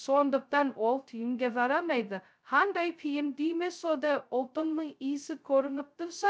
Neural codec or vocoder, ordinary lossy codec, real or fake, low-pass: codec, 16 kHz, 0.2 kbps, FocalCodec; none; fake; none